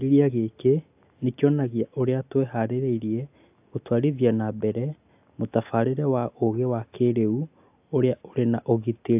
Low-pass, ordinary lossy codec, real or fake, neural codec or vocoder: 3.6 kHz; AAC, 32 kbps; real; none